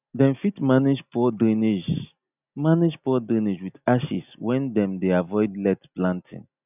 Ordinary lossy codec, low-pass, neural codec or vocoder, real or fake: none; 3.6 kHz; none; real